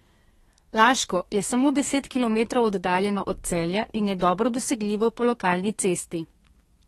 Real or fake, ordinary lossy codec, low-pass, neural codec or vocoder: fake; AAC, 32 kbps; 14.4 kHz; codec, 32 kHz, 1.9 kbps, SNAC